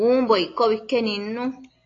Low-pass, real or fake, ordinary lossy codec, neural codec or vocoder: 7.2 kHz; real; AAC, 32 kbps; none